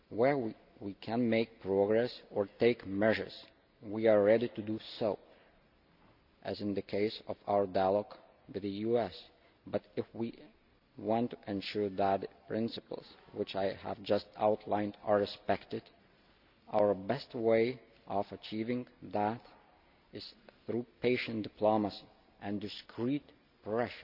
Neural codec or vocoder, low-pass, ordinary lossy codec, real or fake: none; 5.4 kHz; none; real